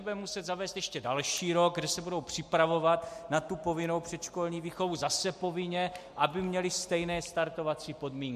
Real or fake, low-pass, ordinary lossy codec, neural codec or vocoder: real; 14.4 kHz; MP3, 64 kbps; none